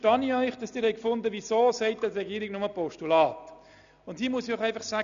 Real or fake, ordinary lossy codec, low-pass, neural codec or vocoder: real; none; 7.2 kHz; none